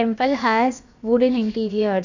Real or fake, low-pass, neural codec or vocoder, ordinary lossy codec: fake; 7.2 kHz; codec, 16 kHz, about 1 kbps, DyCAST, with the encoder's durations; none